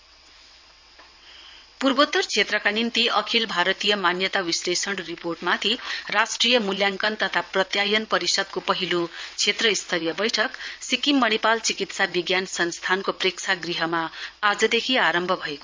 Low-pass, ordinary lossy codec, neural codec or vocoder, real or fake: 7.2 kHz; MP3, 64 kbps; vocoder, 22.05 kHz, 80 mel bands, WaveNeXt; fake